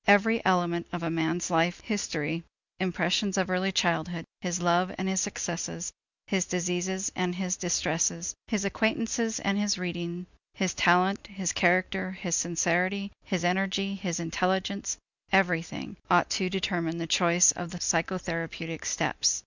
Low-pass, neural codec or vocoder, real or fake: 7.2 kHz; none; real